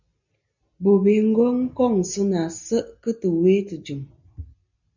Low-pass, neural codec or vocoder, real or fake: 7.2 kHz; none; real